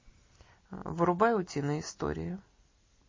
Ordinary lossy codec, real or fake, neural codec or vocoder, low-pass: MP3, 32 kbps; real; none; 7.2 kHz